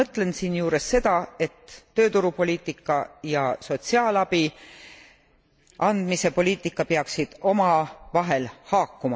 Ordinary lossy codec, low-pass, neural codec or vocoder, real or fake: none; none; none; real